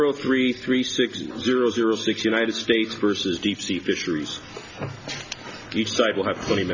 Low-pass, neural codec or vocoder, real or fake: 7.2 kHz; none; real